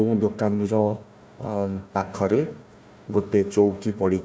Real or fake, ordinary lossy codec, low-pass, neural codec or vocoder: fake; none; none; codec, 16 kHz, 1 kbps, FunCodec, trained on Chinese and English, 50 frames a second